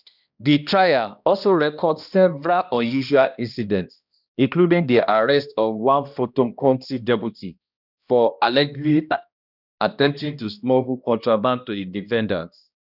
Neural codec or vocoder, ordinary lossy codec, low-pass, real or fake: codec, 16 kHz, 1 kbps, X-Codec, HuBERT features, trained on balanced general audio; none; 5.4 kHz; fake